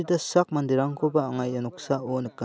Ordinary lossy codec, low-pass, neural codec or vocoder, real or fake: none; none; none; real